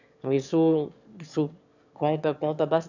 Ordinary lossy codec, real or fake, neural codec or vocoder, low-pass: none; fake; autoencoder, 22.05 kHz, a latent of 192 numbers a frame, VITS, trained on one speaker; 7.2 kHz